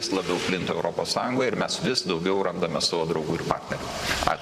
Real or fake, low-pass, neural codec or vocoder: fake; 14.4 kHz; vocoder, 44.1 kHz, 128 mel bands, Pupu-Vocoder